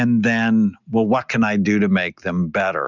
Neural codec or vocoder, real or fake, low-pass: none; real; 7.2 kHz